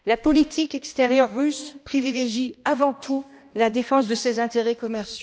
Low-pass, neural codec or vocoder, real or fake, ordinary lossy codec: none; codec, 16 kHz, 1 kbps, X-Codec, HuBERT features, trained on balanced general audio; fake; none